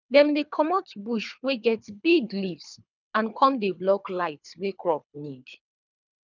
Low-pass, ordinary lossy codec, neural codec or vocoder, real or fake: 7.2 kHz; none; codec, 24 kHz, 3 kbps, HILCodec; fake